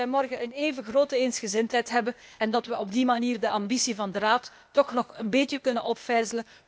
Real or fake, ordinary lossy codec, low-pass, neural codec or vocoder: fake; none; none; codec, 16 kHz, 0.8 kbps, ZipCodec